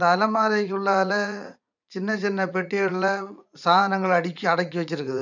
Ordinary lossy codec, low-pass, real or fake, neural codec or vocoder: none; 7.2 kHz; fake; vocoder, 44.1 kHz, 80 mel bands, Vocos